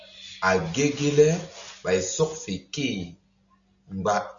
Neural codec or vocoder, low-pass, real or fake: none; 7.2 kHz; real